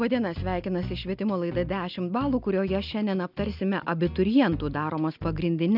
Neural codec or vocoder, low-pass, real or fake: none; 5.4 kHz; real